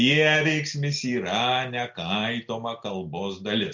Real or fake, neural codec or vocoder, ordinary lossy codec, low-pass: real; none; MP3, 64 kbps; 7.2 kHz